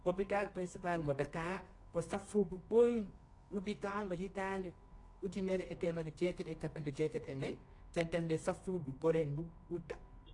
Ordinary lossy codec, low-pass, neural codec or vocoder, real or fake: AAC, 48 kbps; 10.8 kHz; codec, 24 kHz, 0.9 kbps, WavTokenizer, medium music audio release; fake